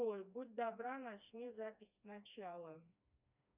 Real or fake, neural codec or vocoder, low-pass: fake; codec, 16 kHz, 2 kbps, FreqCodec, smaller model; 3.6 kHz